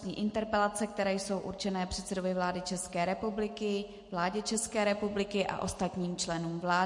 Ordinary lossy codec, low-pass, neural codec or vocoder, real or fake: MP3, 48 kbps; 10.8 kHz; none; real